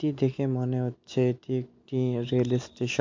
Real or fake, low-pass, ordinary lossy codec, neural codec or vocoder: real; 7.2 kHz; MP3, 48 kbps; none